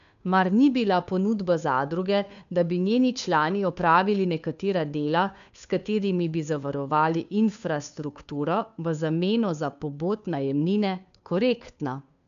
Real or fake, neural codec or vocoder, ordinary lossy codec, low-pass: fake; codec, 16 kHz, 2 kbps, FunCodec, trained on Chinese and English, 25 frames a second; none; 7.2 kHz